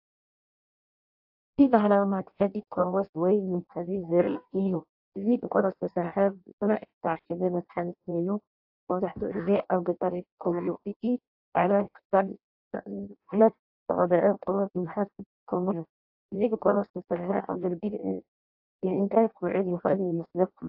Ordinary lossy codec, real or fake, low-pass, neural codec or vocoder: AAC, 48 kbps; fake; 5.4 kHz; codec, 16 kHz in and 24 kHz out, 0.6 kbps, FireRedTTS-2 codec